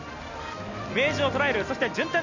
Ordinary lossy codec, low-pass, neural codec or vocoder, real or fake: none; 7.2 kHz; none; real